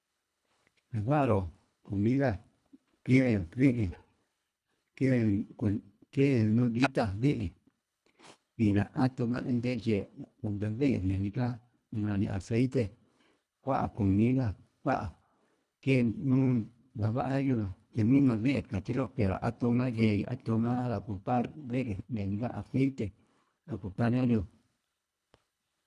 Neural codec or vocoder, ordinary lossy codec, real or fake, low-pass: codec, 24 kHz, 1.5 kbps, HILCodec; none; fake; none